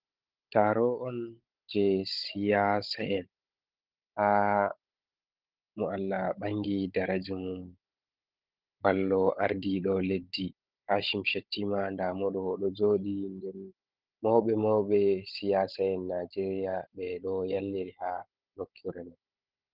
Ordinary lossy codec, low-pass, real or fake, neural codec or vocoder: Opus, 32 kbps; 5.4 kHz; fake; codec, 16 kHz, 16 kbps, FunCodec, trained on Chinese and English, 50 frames a second